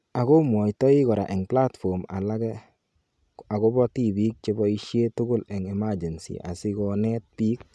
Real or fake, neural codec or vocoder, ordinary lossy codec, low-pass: real; none; none; none